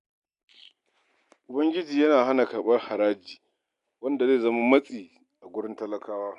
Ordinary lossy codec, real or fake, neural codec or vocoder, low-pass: none; real; none; 10.8 kHz